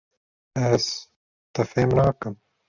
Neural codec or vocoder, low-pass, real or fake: none; 7.2 kHz; real